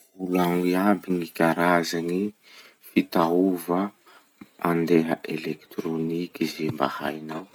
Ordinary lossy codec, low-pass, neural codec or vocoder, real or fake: none; none; none; real